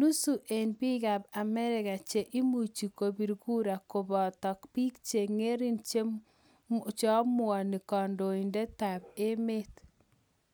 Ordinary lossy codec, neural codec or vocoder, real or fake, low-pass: none; none; real; none